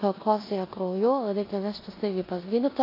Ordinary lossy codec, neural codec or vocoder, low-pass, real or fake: AAC, 24 kbps; codec, 16 kHz in and 24 kHz out, 0.9 kbps, LongCat-Audio-Codec, four codebook decoder; 5.4 kHz; fake